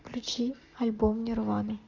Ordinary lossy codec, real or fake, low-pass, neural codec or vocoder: MP3, 64 kbps; real; 7.2 kHz; none